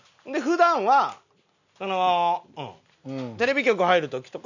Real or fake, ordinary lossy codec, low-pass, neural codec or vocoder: real; none; 7.2 kHz; none